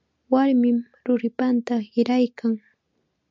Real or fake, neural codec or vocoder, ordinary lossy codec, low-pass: real; none; MP3, 64 kbps; 7.2 kHz